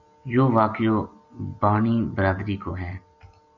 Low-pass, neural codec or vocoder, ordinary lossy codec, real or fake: 7.2 kHz; none; MP3, 64 kbps; real